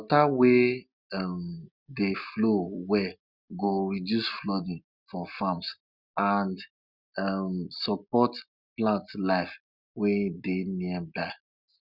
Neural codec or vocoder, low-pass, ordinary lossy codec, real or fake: none; 5.4 kHz; none; real